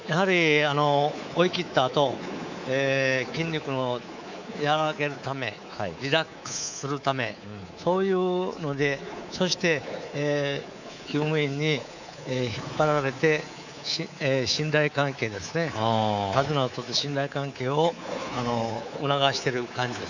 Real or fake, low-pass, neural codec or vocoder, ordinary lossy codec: fake; 7.2 kHz; codec, 24 kHz, 3.1 kbps, DualCodec; none